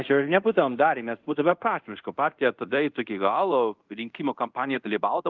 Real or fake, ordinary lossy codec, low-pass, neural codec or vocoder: fake; Opus, 24 kbps; 7.2 kHz; codec, 24 kHz, 0.5 kbps, DualCodec